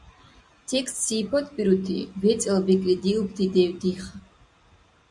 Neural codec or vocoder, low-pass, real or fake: none; 10.8 kHz; real